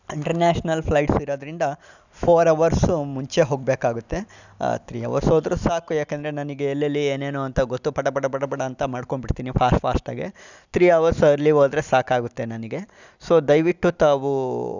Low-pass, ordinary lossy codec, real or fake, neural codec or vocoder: 7.2 kHz; none; real; none